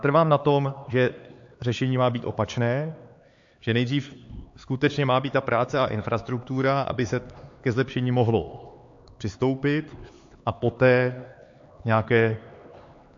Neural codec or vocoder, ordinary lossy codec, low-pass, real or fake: codec, 16 kHz, 4 kbps, X-Codec, HuBERT features, trained on LibriSpeech; AAC, 48 kbps; 7.2 kHz; fake